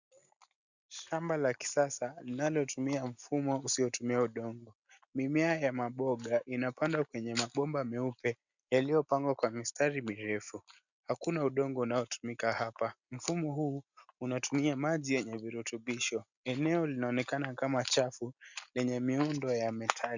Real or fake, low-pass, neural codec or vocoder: real; 7.2 kHz; none